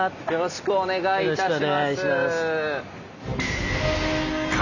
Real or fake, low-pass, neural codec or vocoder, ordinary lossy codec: real; 7.2 kHz; none; none